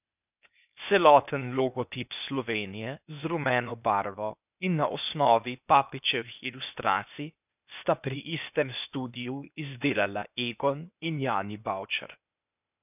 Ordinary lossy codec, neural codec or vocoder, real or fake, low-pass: none; codec, 16 kHz, 0.8 kbps, ZipCodec; fake; 3.6 kHz